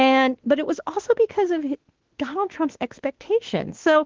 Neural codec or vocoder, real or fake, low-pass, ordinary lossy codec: autoencoder, 48 kHz, 32 numbers a frame, DAC-VAE, trained on Japanese speech; fake; 7.2 kHz; Opus, 16 kbps